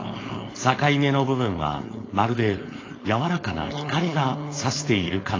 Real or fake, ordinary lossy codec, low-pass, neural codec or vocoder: fake; AAC, 32 kbps; 7.2 kHz; codec, 16 kHz, 4.8 kbps, FACodec